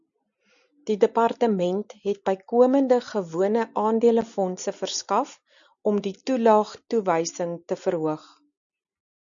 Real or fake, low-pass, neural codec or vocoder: real; 7.2 kHz; none